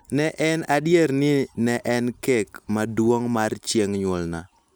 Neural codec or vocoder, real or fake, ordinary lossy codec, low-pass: none; real; none; none